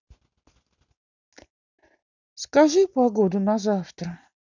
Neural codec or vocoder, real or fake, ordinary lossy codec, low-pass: none; real; none; 7.2 kHz